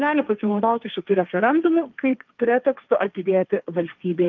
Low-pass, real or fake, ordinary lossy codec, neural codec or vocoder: 7.2 kHz; fake; Opus, 32 kbps; codec, 16 kHz, 1.1 kbps, Voila-Tokenizer